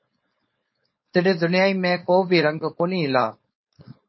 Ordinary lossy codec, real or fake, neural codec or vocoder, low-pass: MP3, 24 kbps; fake; codec, 16 kHz, 4.8 kbps, FACodec; 7.2 kHz